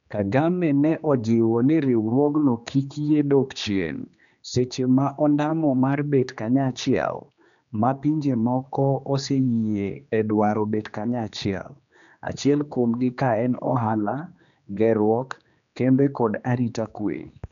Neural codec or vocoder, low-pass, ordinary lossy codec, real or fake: codec, 16 kHz, 2 kbps, X-Codec, HuBERT features, trained on general audio; 7.2 kHz; none; fake